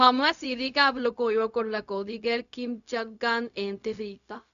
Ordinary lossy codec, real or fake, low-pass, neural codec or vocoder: none; fake; 7.2 kHz; codec, 16 kHz, 0.4 kbps, LongCat-Audio-Codec